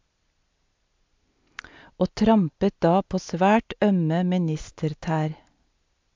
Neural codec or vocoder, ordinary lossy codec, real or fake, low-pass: none; MP3, 64 kbps; real; 7.2 kHz